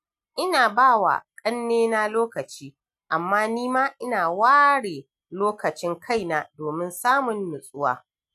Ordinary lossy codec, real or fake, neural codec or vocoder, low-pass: none; real; none; 14.4 kHz